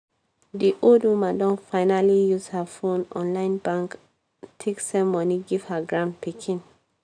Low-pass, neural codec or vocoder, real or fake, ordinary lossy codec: 9.9 kHz; none; real; MP3, 96 kbps